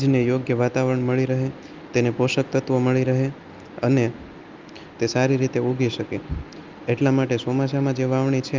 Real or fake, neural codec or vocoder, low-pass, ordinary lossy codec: real; none; 7.2 kHz; Opus, 24 kbps